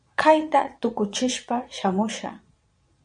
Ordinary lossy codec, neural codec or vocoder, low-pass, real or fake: MP3, 64 kbps; vocoder, 22.05 kHz, 80 mel bands, Vocos; 9.9 kHz; fake